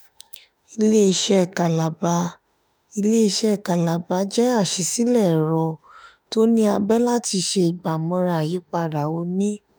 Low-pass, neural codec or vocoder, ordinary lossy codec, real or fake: none; autoencoder, 48 kHz, 32 numbers a frame, DAC-VAE, trained on Japanese speech; none; fake